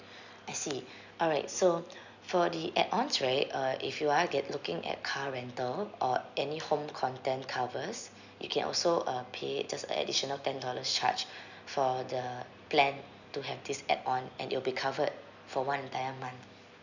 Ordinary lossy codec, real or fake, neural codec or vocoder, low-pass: none; real; none; 7.2 kHz